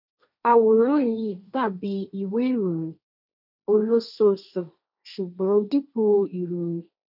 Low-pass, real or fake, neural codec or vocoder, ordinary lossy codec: 5.4 kHz; fake; codec, 16 kHz, 1.1 kbps, Voila-Tokenizer; none